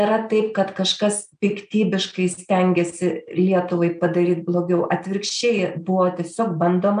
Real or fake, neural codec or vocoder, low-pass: real; none; 9.9 kHz